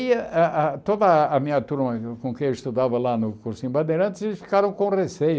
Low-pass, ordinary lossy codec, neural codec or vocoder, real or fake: none; none; none; real